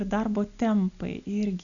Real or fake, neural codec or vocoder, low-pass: real; none; 7.2 kHz